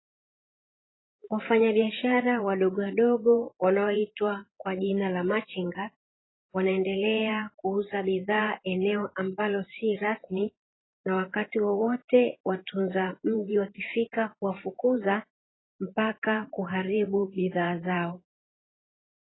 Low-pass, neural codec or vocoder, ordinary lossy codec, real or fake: 7.2 kHz; vocoder, 44.1 kHz, 128 mel bands every 512 samples, BigVGAN v2; AAC, 16 kbps; fake